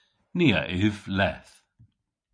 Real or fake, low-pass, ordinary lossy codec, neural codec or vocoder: real; 9.9 kHz; MP3, 48 kbps; none